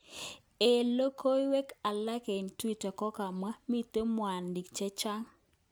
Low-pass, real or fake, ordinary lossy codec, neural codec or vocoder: none; real; none; none